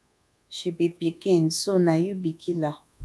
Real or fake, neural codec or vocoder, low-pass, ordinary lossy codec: fake; codec, 24 kHz, 1.2 kbps, DualCodec; none; none